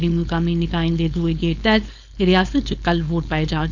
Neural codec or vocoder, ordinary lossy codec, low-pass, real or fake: codec, 16 kHz, 4.8 kbps, FACodec; none; 7.2 kHz; fake